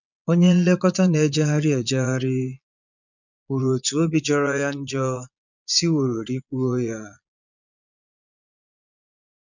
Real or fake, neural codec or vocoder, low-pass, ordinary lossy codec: fake; vocoder, 22.05 kHz, 80 mel bands, Vocos; 7.2 kHz; none